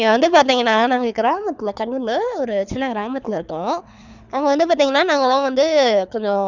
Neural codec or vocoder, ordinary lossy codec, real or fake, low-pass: codec, 24 kHz, 6 kbps, HILCodec; none; fake; 7.2 kHz